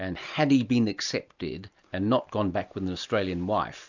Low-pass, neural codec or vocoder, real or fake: 7.2 kHz; none; real